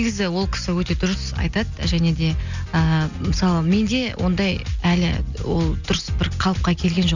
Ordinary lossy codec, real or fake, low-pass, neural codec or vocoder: none; real; 7.2 kHz; none